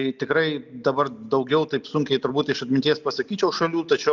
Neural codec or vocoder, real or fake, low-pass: none; real; 7.2 kHz